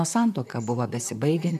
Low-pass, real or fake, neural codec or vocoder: 14.4 kHz; fake; vocoder, 44.1 kHz, 128 mel bands, Pupu-Vocoder